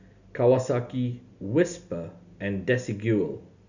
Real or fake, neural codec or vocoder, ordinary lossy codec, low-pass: real; none; none; 7.2 kHz